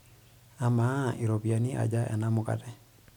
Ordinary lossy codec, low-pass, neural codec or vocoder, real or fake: none; 19.8 kHz; none; real